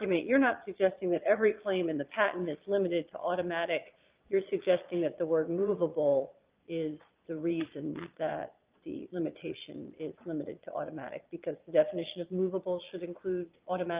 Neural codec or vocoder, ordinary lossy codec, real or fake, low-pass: vocoder, 22.05 kHz, 80 mel bands, Vocos; Opus, 24 kbps; fake; 3.6 kHz